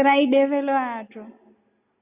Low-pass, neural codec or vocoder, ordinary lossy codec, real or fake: 3.6 kHz; none; Opus, 64 kbps; real